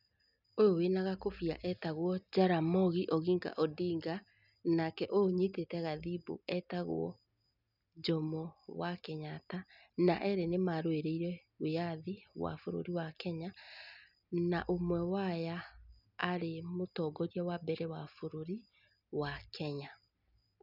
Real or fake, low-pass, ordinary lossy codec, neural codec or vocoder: real; 5.4 kHz; none; none